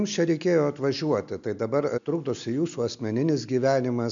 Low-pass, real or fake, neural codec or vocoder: 7.2 kHz; real; none